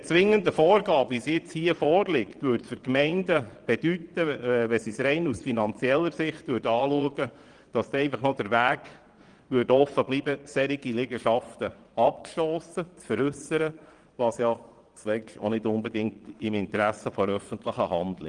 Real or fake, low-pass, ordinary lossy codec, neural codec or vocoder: fake; 9.9 kHz; Opus, 24 kbps; vocoder, 22.05 kHz, 80 mel bands, Vocos